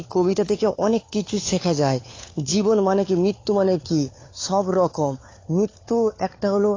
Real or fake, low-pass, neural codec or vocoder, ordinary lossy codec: fake; 7.2 kHz; codec, 16 kHz, 4 kbps, FunCodec, trained on LibriTTS, 50 frames a second; AAC, 32 kbps